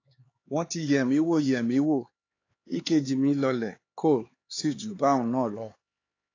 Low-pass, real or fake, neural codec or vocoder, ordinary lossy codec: 7.2 kHz; fake; codec, 16 kHz, 4 kbps, X-Codec, HuBERT features, trained on LibriSpeech; AAC, 32 kbps